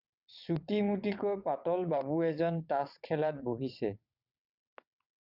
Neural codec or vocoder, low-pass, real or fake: vocoder, 22.05 kHz, 80 mel bands, WaveNeXt; 5.4 kHz; fake